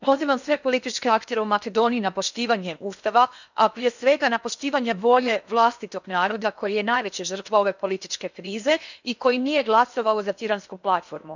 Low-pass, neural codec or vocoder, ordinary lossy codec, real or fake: 7.2 kHz; codec, 16 kHz in and 24 kHz out, 0.8 kbps, FocalCodec, streaming, 65536 codes; none; fake